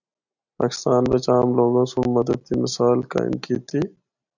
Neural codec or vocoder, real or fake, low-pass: none; real; 7.2 kHz